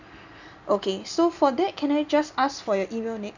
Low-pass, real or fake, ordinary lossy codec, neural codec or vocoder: 7.2 kHz; real; none; none